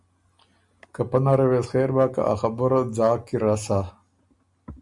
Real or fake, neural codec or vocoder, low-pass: real; none; 10.8 kHz